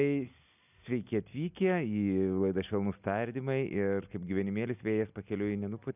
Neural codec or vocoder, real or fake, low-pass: none; real; 3.6 kHz